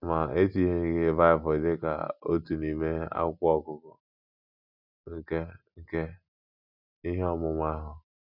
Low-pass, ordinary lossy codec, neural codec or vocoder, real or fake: 5.4 kHz; none; none; real